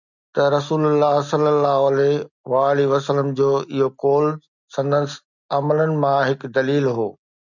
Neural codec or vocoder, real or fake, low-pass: none; real; 7.2 kHz